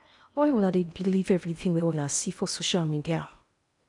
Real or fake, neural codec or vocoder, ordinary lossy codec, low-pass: fake; codec, 16 kHz in and 24 kHz out, 0.8 kbps, FocalCodec, streaming, 65536 codes; none; 10.8 kHz